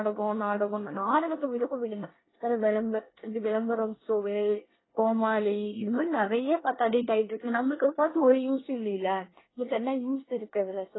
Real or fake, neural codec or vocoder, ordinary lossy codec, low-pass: fake; codec, 24 kHz, 1 kbps, SNAC; AAC, 16 kbps; 7.2 kHz